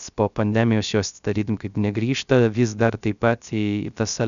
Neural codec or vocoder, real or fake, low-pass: codec, 16 kHz, 0.3 kbps, FocalCodec; fake; 7.2 kHz